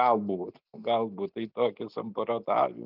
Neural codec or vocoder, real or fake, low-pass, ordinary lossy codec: none; real; 5.4 kHz; Opus, 24 kbps